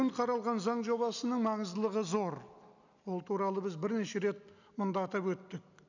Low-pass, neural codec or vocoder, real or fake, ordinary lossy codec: 7.2 kHz; none; real; none